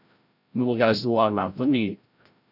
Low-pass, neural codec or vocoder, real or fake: 5.4 kHz; codec, 16 kHz, 0.5 kbps, FreqCodec, larger model; fake